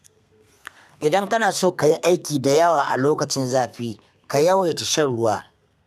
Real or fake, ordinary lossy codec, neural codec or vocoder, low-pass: fake; none; codec, 32 kHz, 1.9 kbps, SNAC; 14.4 kHz